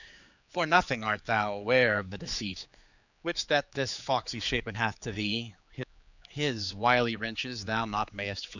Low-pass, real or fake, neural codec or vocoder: 7.2 kHz; fake; codec, 16 kHz, 4 kbps, X-Codec, HuBERT features, trained on general audio